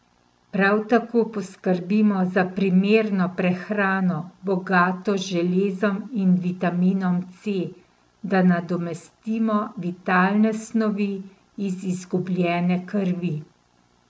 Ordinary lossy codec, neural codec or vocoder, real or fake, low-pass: none; none; real; none